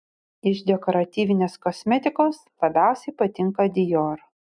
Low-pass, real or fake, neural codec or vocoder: 9.9 kHz; real; none